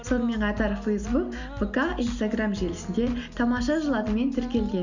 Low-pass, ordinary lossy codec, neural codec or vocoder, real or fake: 7.2 kHz; none; none; real